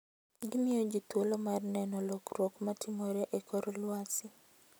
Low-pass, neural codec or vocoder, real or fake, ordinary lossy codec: none; none; real; none